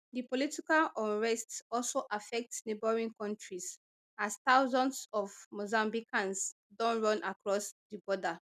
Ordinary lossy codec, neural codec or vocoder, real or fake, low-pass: none; none; real; 14.4 kHz